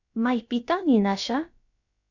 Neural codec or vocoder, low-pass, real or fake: codec, 16 kHz, about 1 kbps, DyCAST, with the encoder's durations; 7.2 kHz; fake